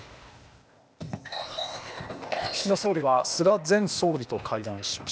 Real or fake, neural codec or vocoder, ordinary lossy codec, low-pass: fake; codec, 16 kHz, 0.8 kbps, ZipCodec; none; none